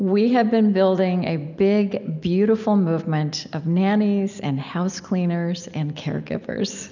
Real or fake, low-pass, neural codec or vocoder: real; 7.2 kHz; none